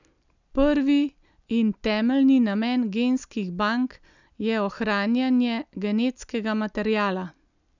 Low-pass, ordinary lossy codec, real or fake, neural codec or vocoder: 7.2 kHz; none; real; none